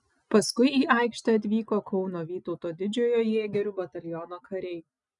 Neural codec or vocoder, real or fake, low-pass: none; real; 10.8 kHz